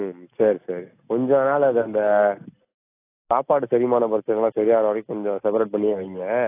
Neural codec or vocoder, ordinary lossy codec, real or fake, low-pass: none; AAC, 24 kbps; real; 3.6 kHz